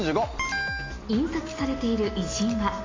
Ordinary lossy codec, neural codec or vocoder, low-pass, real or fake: AAC, 32 kbps; none; 7.2 kHz; real